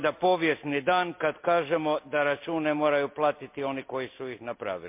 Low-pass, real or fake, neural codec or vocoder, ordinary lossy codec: 3.6 kHz; real; none; MP3, 32 kbps